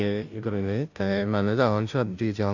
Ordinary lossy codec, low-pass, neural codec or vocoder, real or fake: none; 7.2 kHz; codec, 16 kHz, 0.5 kbps, FunCodec, trained on Chinese and English, 25 frames a second; fake